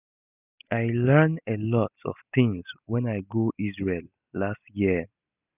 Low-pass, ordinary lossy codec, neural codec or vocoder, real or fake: 3.6 kHz; none; none; real